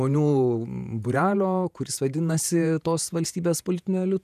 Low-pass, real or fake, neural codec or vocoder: 14.4 kHz; fake; vocoder, 48 kHz, 128 mel bands, Vocos